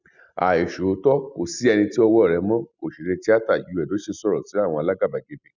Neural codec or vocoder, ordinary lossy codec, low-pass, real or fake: none; none; 7.2 kHz; real